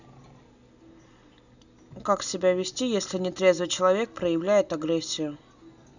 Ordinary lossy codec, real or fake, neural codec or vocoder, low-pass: none; real; none; 7.2 kHz